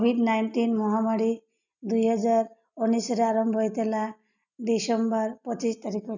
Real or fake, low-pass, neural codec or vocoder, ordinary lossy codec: real; 7.2 kHz; none; none